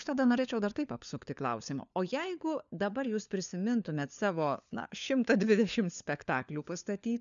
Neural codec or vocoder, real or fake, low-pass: codec, 16 kHz, 4 kbps, FunCodec, trained on LibriTTS, 50 frames a second; fake; 7.2 kHz